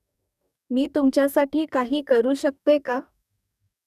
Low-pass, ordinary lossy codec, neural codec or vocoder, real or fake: 14.4 kHz; none; codec, 44.1 kHz, 2.6 kbps, DAC; fake